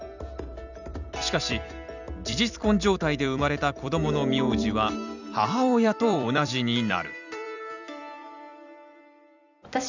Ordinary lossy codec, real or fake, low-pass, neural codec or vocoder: none; fake; 7.2 kHz; vocoder, 44.1 kHz, 128 mel bands every 256 samples, BigVGAN v2